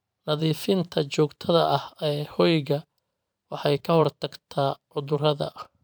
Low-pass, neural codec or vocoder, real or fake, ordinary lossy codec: none; vocoder, 44.1 kHz, 128 mel bands every 512 samples, BigVGAN v2; fake; none